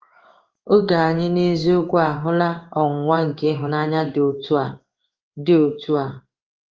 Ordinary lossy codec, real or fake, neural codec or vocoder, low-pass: Opus, 24 kbps; fake; codec, 16 kHz in and 24 kHz out, 1 kbps, XY-Tokenizer; 7.2 kHz